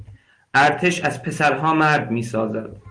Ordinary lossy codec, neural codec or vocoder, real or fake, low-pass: Opus, 24 kbps; none; real; 9.9 kHz